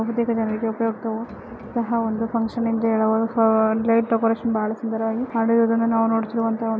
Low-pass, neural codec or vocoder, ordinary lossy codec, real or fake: none; none; none; real